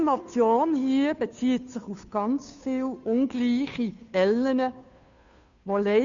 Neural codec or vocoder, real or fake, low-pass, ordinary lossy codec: codec, 16 kHz, 2 kbps, FunCodec, trained on Chinese and English, 25 frames a second; fake; 7.2 kHz; MP3, 96 kbps